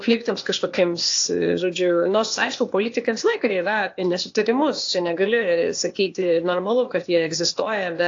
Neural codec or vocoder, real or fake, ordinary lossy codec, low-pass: codec, 16 kHz, 0.8 kbps, ZipCodec; fake; AAC, 48 kbps; 7.2 kHz